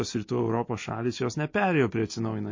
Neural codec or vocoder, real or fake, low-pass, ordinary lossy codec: none; real; 7.2 kHz; MP3, 32 kbps